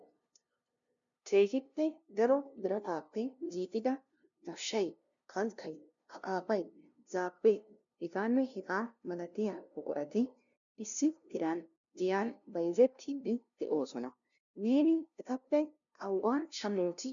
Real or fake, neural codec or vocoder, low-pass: fake; codec, 16 kHz, 0.5 kbps, FunCodec, trained on LibriTTS, 25 frames a second; 7.2 kHz